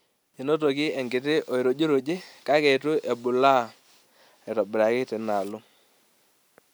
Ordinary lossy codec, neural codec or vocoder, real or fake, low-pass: none; none; real; none